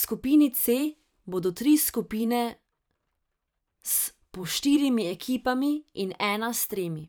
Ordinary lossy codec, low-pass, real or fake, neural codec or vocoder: none; none; fake; vocoder, 44.1 kHz, 128 mel bands every 512 samples, BigVGAN v2